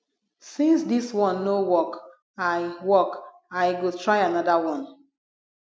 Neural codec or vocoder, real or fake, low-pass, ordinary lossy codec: none; real; none; none